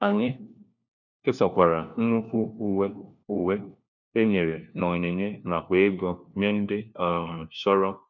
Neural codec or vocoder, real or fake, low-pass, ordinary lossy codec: codec, 16 kHz, 1 kbps, FunCodec, trained on LibriTTS, 50 frames a second; fake; 7.2 kHz; none